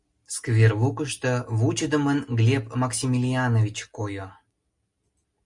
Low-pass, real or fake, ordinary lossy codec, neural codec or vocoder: 10.8 kHz; real; Opus, 64 kbps; none